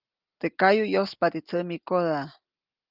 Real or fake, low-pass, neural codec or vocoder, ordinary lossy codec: real; 5.4 kHz; none; Opus, 24 kbps